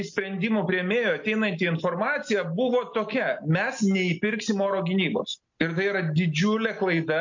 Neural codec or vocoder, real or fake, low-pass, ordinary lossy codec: none; real; 7.2 kHz; MP3, 48 kbps